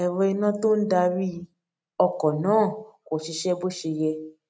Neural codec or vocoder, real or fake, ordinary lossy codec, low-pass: none; real; none; none